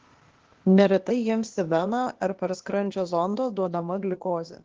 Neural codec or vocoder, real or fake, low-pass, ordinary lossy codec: codec, 16 kHz, 0.8 kbps, ZipCodec; fake; 7.2 kHz; Opus, 16 kbps